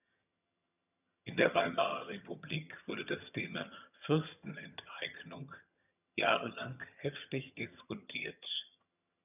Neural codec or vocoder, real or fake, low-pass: vocoder, 22.05 kHz, 80 mel bands, HiFi-GAN; fake; 3.6 kHz